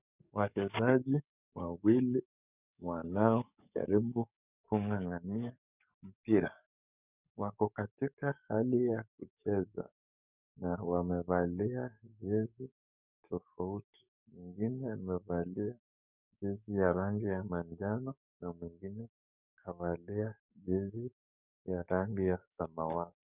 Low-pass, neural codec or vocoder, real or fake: 3.6 kHz; codec, 44.1 kHz, 7.8 kbps, DAC; fake